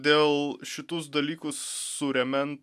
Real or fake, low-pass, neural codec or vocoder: real; 14.4 kHz; none